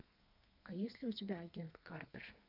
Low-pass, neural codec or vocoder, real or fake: 5.4 kHz; codec, 32 kHz, 1.9 kbps, SNAC; fake